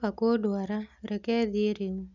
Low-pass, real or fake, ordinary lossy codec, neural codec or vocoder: 7.2 kHz; real; none; none